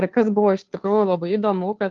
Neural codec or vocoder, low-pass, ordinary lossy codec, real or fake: codec, 16 kHz, 2 kbps, FunCodec, trained on Chinese and English, 25 frames a second; 7.2 kHz; Opus, 32 kbps; fake